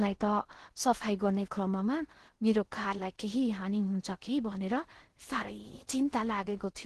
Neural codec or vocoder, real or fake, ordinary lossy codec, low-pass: codec, 16 kHz in and 24 kHz out, 0.6 kbps, FocalCodec, streaming, 4096 codes; fake; Opus, 16 kbps; 10.8 kHz